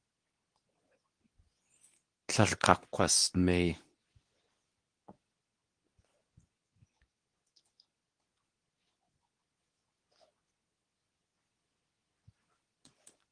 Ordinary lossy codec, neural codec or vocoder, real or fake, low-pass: Opus, 24 kbps; codec, 24 kHz, 0.9 kbps, WavTokenizer, medium speech release version 2; fake; 9.9 kHz